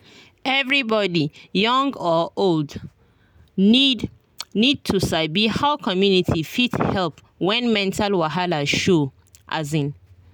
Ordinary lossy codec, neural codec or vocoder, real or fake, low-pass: none; none; real; none